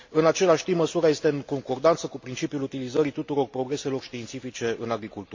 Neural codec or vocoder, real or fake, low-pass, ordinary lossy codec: none; real; 7.2 kHz; MP3, 48 kbps